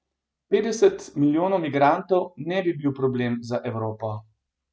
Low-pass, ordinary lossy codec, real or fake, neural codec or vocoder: none; none; real; none